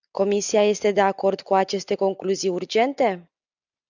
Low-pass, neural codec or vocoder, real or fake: 7.2 kHz; none; real